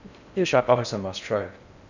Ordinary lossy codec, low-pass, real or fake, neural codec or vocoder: none; 7.2 kHz; fake; codec, 16 kHz in and 24 kHz out, 0.6 kbps, FocalCodec, streaming, 4096 codes